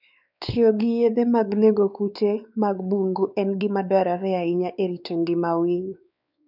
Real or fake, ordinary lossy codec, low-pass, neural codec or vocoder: fake; none; 5.4 kHz; codec, 16 kHz, 4 kbps, X-Codec, WavLM features, trained on Multilingual LibriSpeech